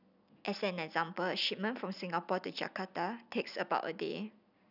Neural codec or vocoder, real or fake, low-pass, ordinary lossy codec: none; real; 5.4 kHz; none